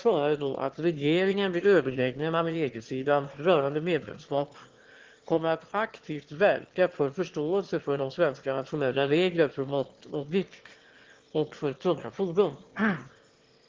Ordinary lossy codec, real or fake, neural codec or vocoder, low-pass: Opus, 16 kbps; fake; autoencoder, 22.05 kHz, a latent of 192 numbers a frame, VITS, trained on one speaker; 7.2 kHz